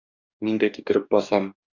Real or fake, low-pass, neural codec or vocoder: fake; 7.2 kHz; codec, 44.1 kHz, 2.6 kbps, DAC